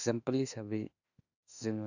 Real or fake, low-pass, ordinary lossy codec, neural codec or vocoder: fake; 7.2 kHz; none; autoencoder, 48 kHz, 32 numbers a frame, DAC-VAE, trained on Japanese speech